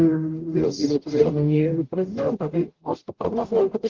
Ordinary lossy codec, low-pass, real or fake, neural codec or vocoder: Opus, 16 kbps; 7.2 kHz; fake; codec, 44.1 kHz, 0.9 kbps, DAC